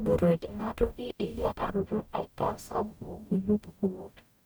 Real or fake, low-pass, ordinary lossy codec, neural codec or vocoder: fake; none; none; codec, 44.1 kHz, 0.9 kbps, DAC